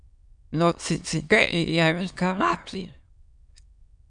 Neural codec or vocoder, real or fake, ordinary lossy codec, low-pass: autoencoder, 22.05 kHz, a latent of 192 numbers a frame, VITS, trained on many speakers; fake; MP3, 96 kbps; 9.9 kHz